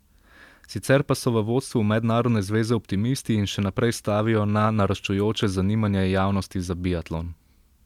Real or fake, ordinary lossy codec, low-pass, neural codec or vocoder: real; MP3, 96 kbps; 19.8 kHz; none